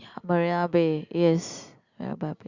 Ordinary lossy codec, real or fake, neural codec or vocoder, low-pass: Opus, 64 kbps; real; none; 7.2 kHz